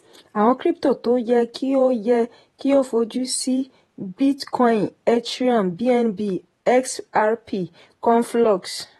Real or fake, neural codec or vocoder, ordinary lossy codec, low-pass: fake; vocoder, 48 kHz, 128 mel bands, Vocos; AAC, 32 kbps; 19.8 kHz